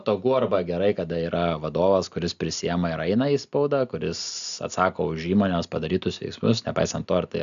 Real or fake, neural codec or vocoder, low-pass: real; none; 7.2 kHz